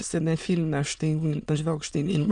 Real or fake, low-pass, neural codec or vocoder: fake; 9.9 kHz; autoencoder, 22.05 kHz, a latent of 192 numbers a frame, VITS, trained on many speakers